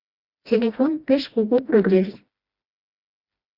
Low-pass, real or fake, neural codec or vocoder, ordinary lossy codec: 5.4 kHz; fake; codec, 16 kHz, 1 kbps, FreqCodec, smaller model; Opus, 64 kbps